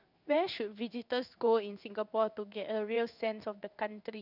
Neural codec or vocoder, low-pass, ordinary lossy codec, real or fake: codec, 16 kHz in and 24 kHz out, 1 kbps, XY-Tokenizer; 5.4 kHz; none; fake